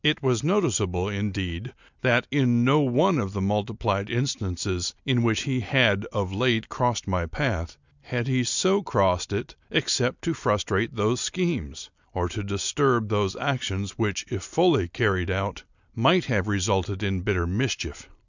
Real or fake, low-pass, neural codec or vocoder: real; 7.2 kHz; none